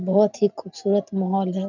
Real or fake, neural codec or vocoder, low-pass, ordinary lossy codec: real; none; 7.2 kHz; none